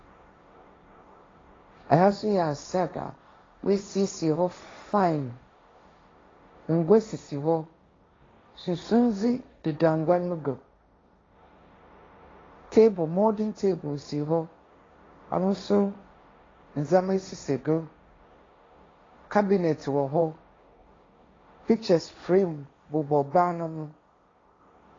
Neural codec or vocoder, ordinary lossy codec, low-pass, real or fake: codec, 16 kHz, 1.1 kbps, Voila-Tokenizer; AAC, 32 kbps; 7.2 kHz; fake